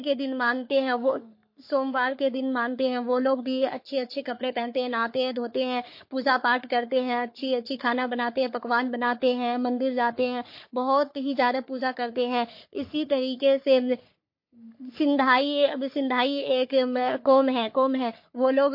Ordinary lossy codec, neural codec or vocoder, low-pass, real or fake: MP3, 32 kbps; codec, 44.1 kHz, 3.4 kbps, Pupu-Codec; 5.4 kHz; fake